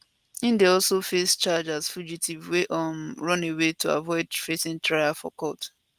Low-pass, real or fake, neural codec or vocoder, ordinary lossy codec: 14.4 kHz; real; none; Opus, 32 kbps